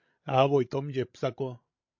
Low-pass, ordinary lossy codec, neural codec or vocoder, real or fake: 7.2 kHz; MP3, 48 kbps; codec, 16 kHz, 16 kbps, FreqCodec, larger model; fake